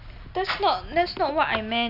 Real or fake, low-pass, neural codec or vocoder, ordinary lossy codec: real; 5.4 kHz; none; none